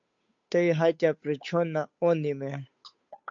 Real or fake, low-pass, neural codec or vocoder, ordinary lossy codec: fake; 7.2 kHz; codec, 16 kHz, 8 kbps, FunCodec, trained on Chinese and English, 25 frames a second; MP3, 48 kbps